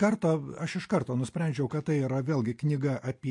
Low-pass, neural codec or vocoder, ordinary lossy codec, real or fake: 10.8 kHz; none; MP3, 48 kbps; real